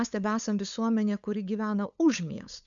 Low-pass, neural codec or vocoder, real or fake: 7.2 kHz; codec, 16 kHz, 8 kbps, FunCodec, trained on LibriTTS, 25 frames a second; fake